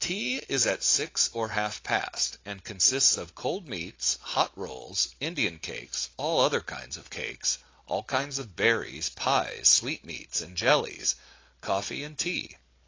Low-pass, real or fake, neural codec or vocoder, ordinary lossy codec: 7.2 kHz; real; none; AAC, 32 kbps